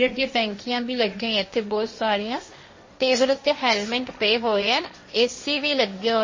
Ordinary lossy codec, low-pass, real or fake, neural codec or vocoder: MP3, 32 kbps; 7.2 kHz; fake; codec, 16 kHz, 1.1 kbps, Voila-Tokenizer